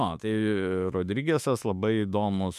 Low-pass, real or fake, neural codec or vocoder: 14.4 kHz; fake; autoencoder, 48 kHz, 32 numbers a frame, DAC-VAE, trained on Japanese speech